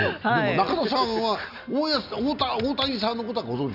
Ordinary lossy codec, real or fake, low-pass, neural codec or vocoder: none; real; 5.4 kHz; none